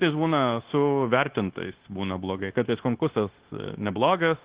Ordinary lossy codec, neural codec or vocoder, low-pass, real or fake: Opus, 16 kbps; codec, 16 kHz, 0.9 kbps, LongCat-Audio-Codec; 3.6 kHz; fake